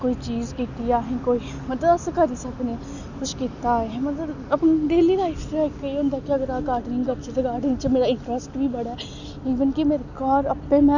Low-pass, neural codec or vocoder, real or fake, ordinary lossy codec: 7.2 kHz; none; real; none